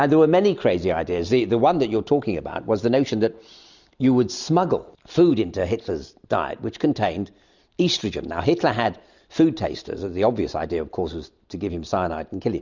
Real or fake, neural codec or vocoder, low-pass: real; none; 7.2 kHz